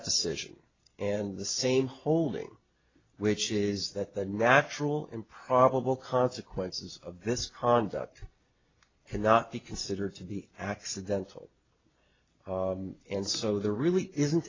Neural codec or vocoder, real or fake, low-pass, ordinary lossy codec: none; real; 7.2 kHz; AAC, 32 kbps